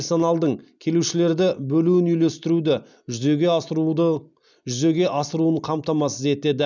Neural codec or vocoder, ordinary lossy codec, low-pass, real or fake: none; none; 7.2 kHz; real